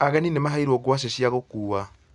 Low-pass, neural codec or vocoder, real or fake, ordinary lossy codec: 10.8 kHz; none; real; none